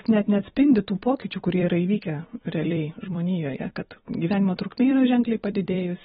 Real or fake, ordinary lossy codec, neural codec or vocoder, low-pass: fake; AAC, 16 kbps; vocoder, 44.1 kHz, 128 mel bands every 256 samples, BigVGAN v2; 19.8 kHz